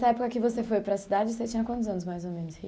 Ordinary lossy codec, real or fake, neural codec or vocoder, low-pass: none; real; none; none